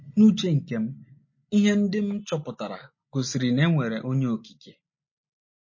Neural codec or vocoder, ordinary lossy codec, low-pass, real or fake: none; MP3, 32 kbps; 7.2 kHz; real